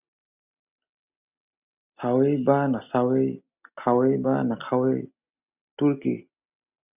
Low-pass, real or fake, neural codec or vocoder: 3.6 kHz; real; none